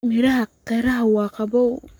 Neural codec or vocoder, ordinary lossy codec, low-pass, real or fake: codec, 44.1 kHz, 7.8 kbps, Pupu-Codec; none; none; fake